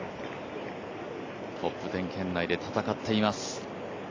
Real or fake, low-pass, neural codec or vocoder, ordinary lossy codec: real; 7.2 kHz; none; AAC, 32 kbps